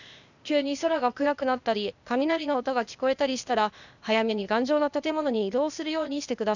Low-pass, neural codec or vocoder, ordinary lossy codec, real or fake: 7.2 kHz; codec, 16 kHz, 0.8 kbps, ZipCodec; none; fake